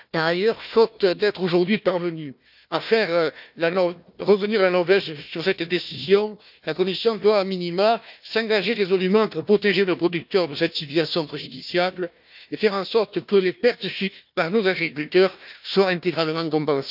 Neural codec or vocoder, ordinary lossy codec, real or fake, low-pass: codec, 16 kHz, 1 kbps, FunCodec, trained on Chinese and English, 50 frames a second; none; fake; 5.4 kHz